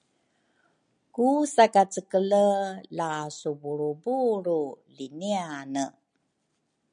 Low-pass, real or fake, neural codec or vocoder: 9.9 kHz; real; none